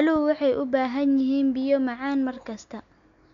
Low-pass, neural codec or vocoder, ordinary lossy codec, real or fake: 7.2 kHz; none; none; real